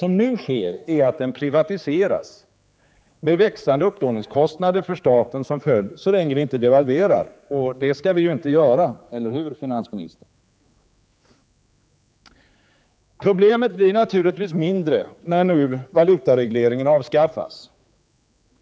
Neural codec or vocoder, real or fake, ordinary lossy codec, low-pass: codec, 16 kHz, 4 kbps, X-Codec, HuBERT features, trained on general audio; fake; none; none